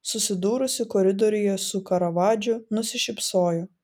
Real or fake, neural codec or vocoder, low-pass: real; none; 19.8 kHz